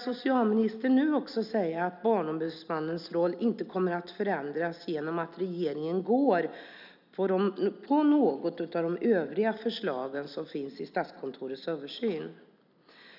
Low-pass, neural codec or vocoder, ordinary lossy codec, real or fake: 5.4 kHz; none; none; real